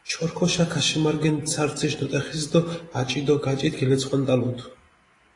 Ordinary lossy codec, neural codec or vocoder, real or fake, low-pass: AAC, 32 kbps; none; real; 10.8 kHz